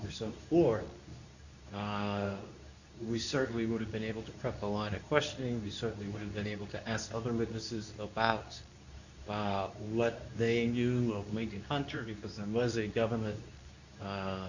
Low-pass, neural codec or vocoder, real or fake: 7.2 kHz; codec, 24 kHz, 0.9 kbps, WavTokenizer, medium speech release version 2; fake